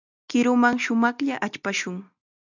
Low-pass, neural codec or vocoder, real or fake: 7.2 kHz; none; real